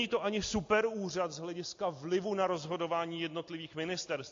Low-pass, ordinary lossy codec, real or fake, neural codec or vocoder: 7.2 kHz; AAC, 48 kbps; real; none